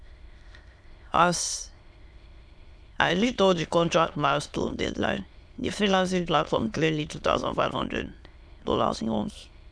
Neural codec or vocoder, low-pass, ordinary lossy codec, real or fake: autoencoder, 22.05 kHz, a latent of 192 numbers a frame, VITS, trained on many speakers; none; none; fake